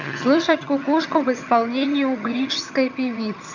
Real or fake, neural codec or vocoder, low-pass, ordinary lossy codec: fake; vocoder, 22.05 kHz, 80 mel bands, HiFi-GAN; 7.2 kHz; none